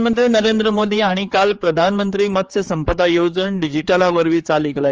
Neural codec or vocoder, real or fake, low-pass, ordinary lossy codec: codec, 16 kHz, 4 kbps, X-Codec, HuBERT features, trained on general audio; fake; 7.2 kHz; Opus, 24 kbps